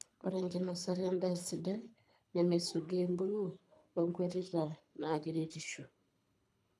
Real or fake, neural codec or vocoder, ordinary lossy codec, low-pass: fake; codec, 24 kHz, 3 kbps, HILCodec; none; none